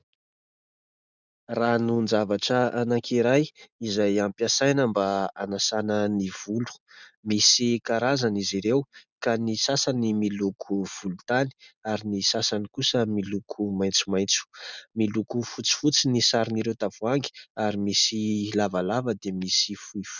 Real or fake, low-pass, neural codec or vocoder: real; 7.2 kHz; none